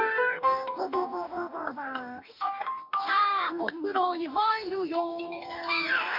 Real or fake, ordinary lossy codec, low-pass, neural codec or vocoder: fake; MP3, 48 kbps; 5.4 kHz; codec, 44.1 kHz, 2.6 kbps, DAC